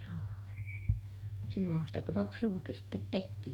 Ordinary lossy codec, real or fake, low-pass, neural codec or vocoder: none; fake; 19.8 kHz; codec, 44.1 kHz, 2.6 kbps, DAC